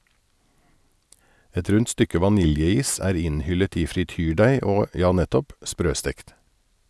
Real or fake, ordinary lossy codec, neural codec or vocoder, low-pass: real; none; none; none